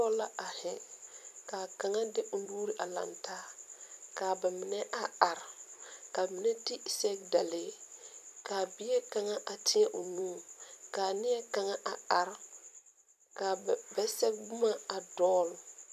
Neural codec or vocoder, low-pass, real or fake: vocoder, 44.1 kHz, 128 mel bands every 256 samples, BigVGAN v2; 14.4 kHz; fake